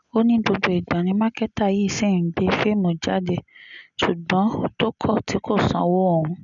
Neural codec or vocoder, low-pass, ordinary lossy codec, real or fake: none; 7.2 kHz; none; real